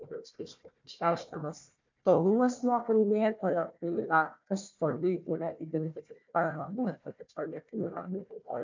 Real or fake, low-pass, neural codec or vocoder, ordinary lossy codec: fake; 7.2 kHz; codec, 16 kHz, 1 kbps, FunCodec, trained on Chinese and English, 50 frames a second; MP3, 64 kbps